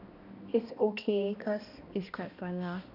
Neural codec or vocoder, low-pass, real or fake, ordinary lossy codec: codec, 16 kHz, 1 kbps, X-Codec, HuBERT features, trained on balanced general audio; 5.4 kHz; fake; none